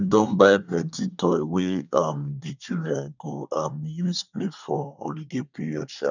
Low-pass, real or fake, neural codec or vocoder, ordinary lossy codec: 7.2 kHz; fake; codec, 24 kHz, 1 kbps, SNAC; none